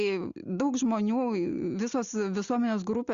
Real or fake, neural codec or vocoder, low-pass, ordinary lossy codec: real; none; 7.2 kHz; Opus, 64 kbps